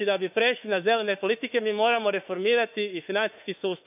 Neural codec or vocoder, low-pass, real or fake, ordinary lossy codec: autoencoder, 48 kHz, 32 numbers a frame, DAC-VAE, trained on Japanese speech; 3.6 kHz; fake; none